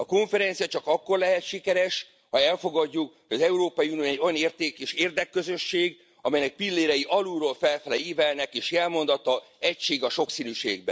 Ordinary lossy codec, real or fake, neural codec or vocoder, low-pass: none; real; none; none